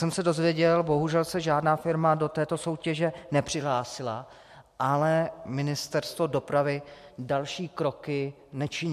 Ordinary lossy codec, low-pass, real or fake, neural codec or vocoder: MP3, 64 kbps; 14.4 kHz; real; none